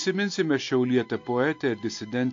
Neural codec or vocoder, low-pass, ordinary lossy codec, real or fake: none; 7.2 kHz; AAC, 48 kbps; real